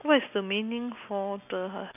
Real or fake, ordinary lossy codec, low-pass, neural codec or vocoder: real; none; 3.6 kHz; none